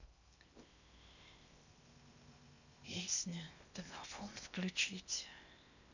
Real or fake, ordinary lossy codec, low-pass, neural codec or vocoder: fake; none; 7.2 kHz; codec, 16 kHz in and 24 kHz out, 0.8 kbps, FocalCodec, streaming, 65536 codes